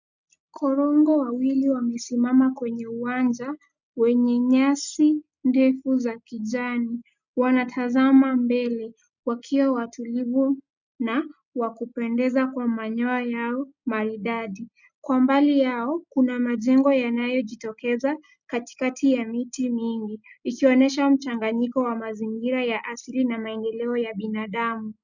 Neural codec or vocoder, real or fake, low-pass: none; real; 7.2 kHz